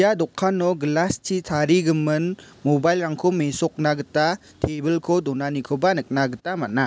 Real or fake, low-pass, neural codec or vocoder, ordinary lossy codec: real; none; none; none